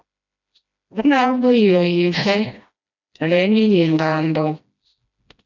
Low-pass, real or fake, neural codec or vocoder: 7.2 kHz; fake; codec, 16 kHz, 1 kbps, FreqCodec, smaller model